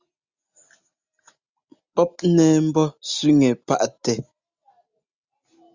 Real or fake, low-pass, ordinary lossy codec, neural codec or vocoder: real; 7.2 kHz; Opus, 64 kbps; none